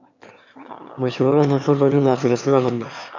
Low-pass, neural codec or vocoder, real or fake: 7.2 kHz; autoencoder, 22.05 kHz, a latent of 192 numbers a frame, VITS, trained on one speaker; fake